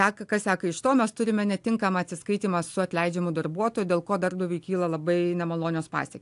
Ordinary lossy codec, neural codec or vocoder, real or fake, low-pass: AAC, 64 kbps; none; real; 10.8 kHz